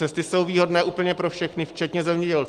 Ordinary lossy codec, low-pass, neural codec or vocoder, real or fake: Opus, 24 kbps; 14.4 kHz; none; real